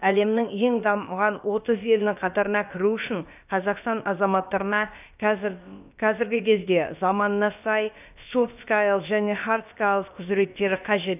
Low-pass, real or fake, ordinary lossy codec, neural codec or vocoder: 3.6 kHz; fake; none; codec, 16 kHz, about 1 kbps, DyCAST, with the encoder's durations